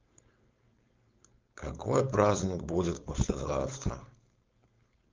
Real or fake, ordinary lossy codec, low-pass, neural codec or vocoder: fake; Opus, 24 kbps; 7.2 kHz; codec, 16 kHz, 4.8 kbps, FACodec